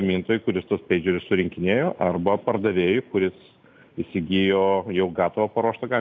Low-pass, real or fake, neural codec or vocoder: 7.2 kHz; real; none